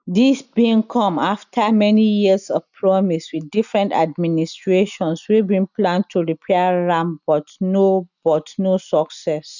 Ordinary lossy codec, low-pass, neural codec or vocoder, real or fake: none; 7.2 kHz; none; real